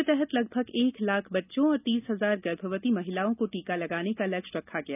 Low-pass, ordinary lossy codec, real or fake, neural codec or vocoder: 3.6 kHz; none; real; none